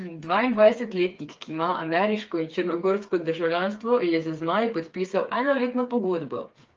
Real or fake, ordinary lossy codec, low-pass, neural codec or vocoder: fake; Opus, 24 kbps; 7.2 kHz; codec, 16 kHz, 4 kbps, FreqCodec, smaller model